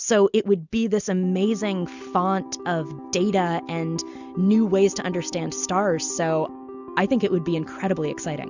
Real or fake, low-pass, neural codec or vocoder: real; 7.2 kHz; none